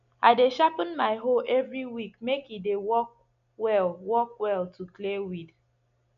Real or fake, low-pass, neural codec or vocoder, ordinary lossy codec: real; 7.2 kHz; none; none